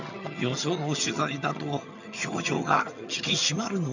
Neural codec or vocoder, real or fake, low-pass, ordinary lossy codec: vocoder, 22.05 kHz, 80 mel bands, HiFi-GAN; fake; 7.2 kHz; none